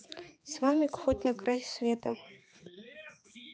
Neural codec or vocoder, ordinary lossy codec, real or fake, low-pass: codec, 16 kHz, 4 kbps, X-Codec, HuBERT features, trained on balanced general audio; none; fake; none